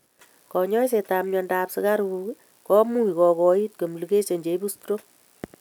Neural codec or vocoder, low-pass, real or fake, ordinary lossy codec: none; none; real; none